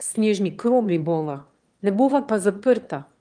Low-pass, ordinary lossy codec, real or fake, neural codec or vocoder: 9.9 kHz; Opus, 32 kbps; fake; autoencoder, 22.05 kHz, a latent of 192 numbers a frame, VITS, trained on one speaker